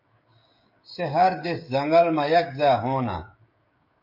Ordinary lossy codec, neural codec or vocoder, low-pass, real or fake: MP3, 32 kbps; codec, 16 kHz, 16 kbps, FreqCodec, smaller model; 5.4 kHz; fake